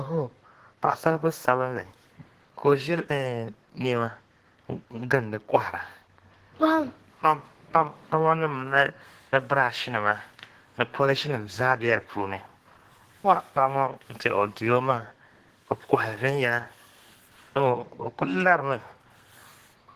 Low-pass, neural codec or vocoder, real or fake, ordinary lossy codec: 14.4 kHz; codec, 32 kHz, 1.9 kbps, SNAC; fake; Opus, 16 kbps